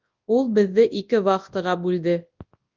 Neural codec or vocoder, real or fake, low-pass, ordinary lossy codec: codec, 24 kHz, 0.9 kbps, WavTokenizer, large speech release; fake; 7.2 kHz; Opus, 24 kbps